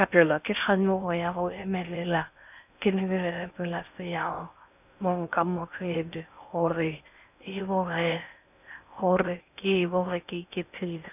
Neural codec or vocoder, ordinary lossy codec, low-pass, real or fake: codec, 16 kHz in and 24 kHz out, 0.6 kbps, FocalCodec, streaming, 4096 codes; none; 3.6 kHz; fake